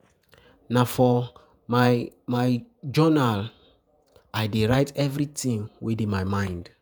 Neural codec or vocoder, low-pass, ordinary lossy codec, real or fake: vocoder, 48 kHz, 128 mel bands, Vocos; none; none; fake